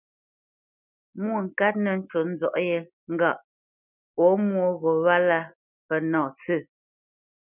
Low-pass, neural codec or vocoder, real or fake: 3.6 kHz; none; real